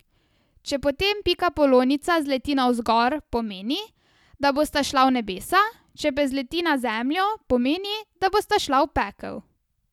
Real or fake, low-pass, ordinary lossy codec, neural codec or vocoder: real; 19.8 kHz; none; none